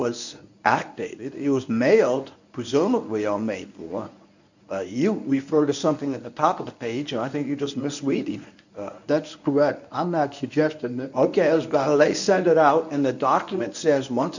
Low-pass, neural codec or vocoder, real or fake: 7.2 kHz; codec, 24 kHz, 0.9 kbps, WavTokenizer, medium speech release version 1; fake